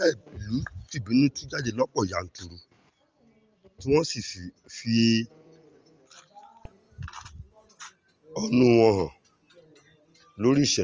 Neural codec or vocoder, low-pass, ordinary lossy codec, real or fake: none; 7.2 kHz; Opus, 24 kbps; real